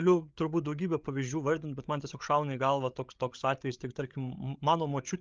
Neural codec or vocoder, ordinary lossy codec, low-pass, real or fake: codec, 16 kHz, 8 kbps, FreqCodec, larger model; Opus, 24 kbps; 7.2 kHz; fake